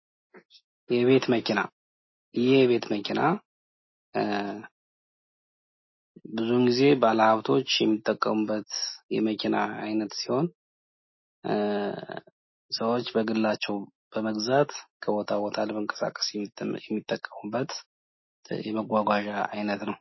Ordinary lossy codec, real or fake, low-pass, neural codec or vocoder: MP3, 24 kbps; real; 7.2 kHz; none